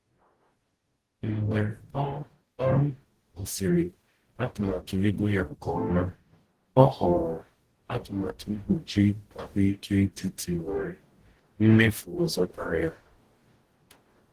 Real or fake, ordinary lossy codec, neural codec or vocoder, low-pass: fake; Opus, 16 kbps; codec, 44.1 kHz, 0.9 kbps, DAC; 14.4 kHz